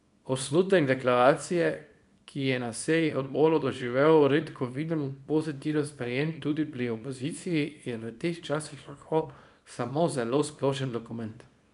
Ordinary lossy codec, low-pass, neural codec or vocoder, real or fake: none; 10.8 kHz; codec, 24 kHz, 0.9 kbps, WavTokenizer, small release; fake